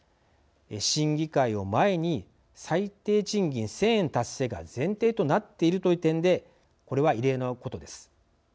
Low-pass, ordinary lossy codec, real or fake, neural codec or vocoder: none; none; real; none